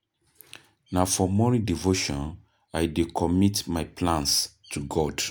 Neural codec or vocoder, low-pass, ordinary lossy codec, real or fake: none; none; none; real